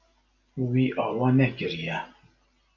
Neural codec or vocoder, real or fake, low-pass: none; real; 7.2 kHz